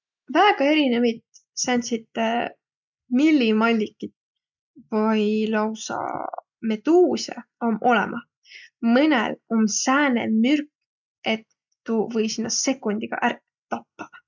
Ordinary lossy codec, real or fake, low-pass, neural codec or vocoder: none; real; 7.2 kHz; none